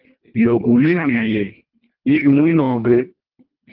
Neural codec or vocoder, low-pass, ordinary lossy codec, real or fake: codec, 24 kHz, 1.5 kbps, HILCodec; 5.4 kHz; Opus, 32 kbps; fake